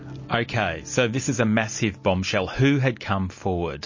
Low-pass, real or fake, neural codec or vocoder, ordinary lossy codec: 7.2 kHz; real; none; MP3, 32 kbps